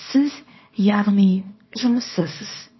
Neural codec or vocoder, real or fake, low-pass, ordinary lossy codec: codec, 24 kHz, 0.9 kbps, WavTokenizer, small release; fake; 7.2 kHz; MP3, 24 kbps